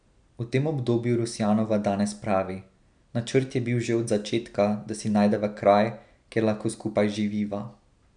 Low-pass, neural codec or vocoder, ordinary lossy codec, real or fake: 9.9 kHz; none; none; real